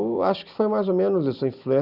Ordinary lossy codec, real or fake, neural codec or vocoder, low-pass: Opus, 64 kbps; real; none; 5.4 kHz